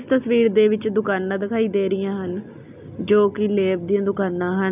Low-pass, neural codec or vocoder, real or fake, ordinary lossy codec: 3.6 kHz; none; real; none